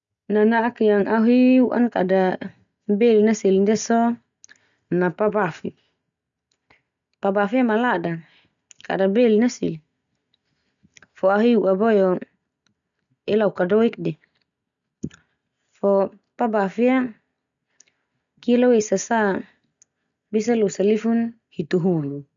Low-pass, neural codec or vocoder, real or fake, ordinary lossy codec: 7.2 kHz; none; real; none